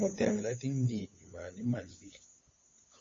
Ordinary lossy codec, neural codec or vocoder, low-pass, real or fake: MP3, 32 kbps; codec, 16 kHz, 8 kbps, FunCodec, trained on LibriTTS, 25 frames a second; 7.2 kHz; fake